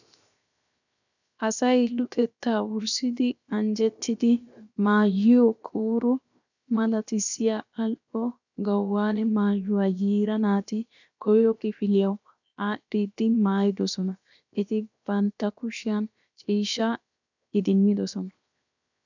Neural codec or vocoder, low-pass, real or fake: codec, 16 kHz, 0.7 kbps, FocalCodec; 7.2 kHz; fake